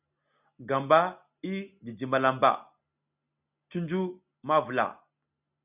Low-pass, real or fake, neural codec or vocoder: 3.6 kHz; real; none